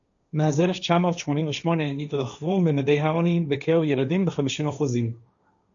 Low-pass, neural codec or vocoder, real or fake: 7.2 kHz; codec, 16 kHz, 1.1 kbps, Voila-Tokenizer; fake